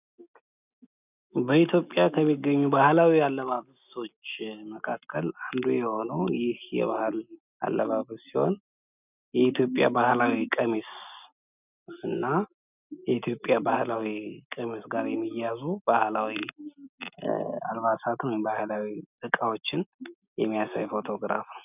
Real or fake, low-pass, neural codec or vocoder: real; 3.6 kHz; none